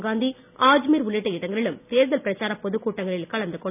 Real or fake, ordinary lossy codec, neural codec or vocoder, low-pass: real; none; none; 3.6 kHz